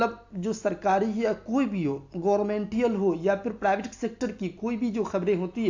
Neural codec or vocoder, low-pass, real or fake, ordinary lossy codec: none; 7.2 kHz; real; AAC, 48 kbps